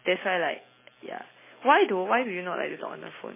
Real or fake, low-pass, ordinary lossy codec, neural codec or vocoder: real; 3.6 kHz; MP3, 16 kbps; none